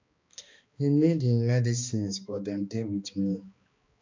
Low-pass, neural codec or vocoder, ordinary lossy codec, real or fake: 7.2 kHz; codec, 16 kHz, 2 kbps, X-Codec, HuBERT features, trained on balanced general audio; MP3, 64 kbps; fake